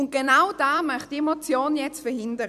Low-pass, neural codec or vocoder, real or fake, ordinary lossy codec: 14.4 kHz; vocoder, 44.1 kHz, 128 mel bands every 256 samples, BigVGAN v2; fake; none